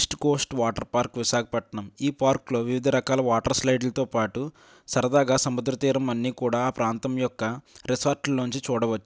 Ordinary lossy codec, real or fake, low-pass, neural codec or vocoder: none; real; none; none